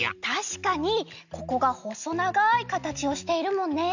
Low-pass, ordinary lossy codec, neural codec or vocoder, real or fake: 7.2 kHz; none; none; real